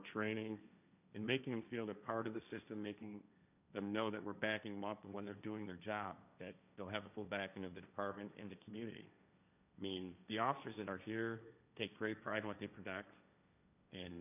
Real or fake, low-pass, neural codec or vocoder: fake; 3.6 kHz; codec, 16 kHz, 1.1 kbps, Voila-Tokenizer